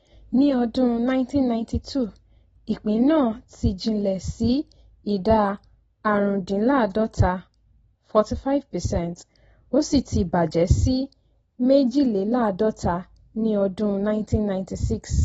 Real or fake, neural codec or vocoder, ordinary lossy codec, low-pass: real; none; AAC, 24 kbps; 10.8 kHz